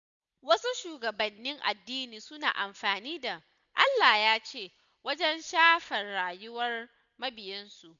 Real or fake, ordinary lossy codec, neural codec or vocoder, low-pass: real; none; none; 7.2 kHz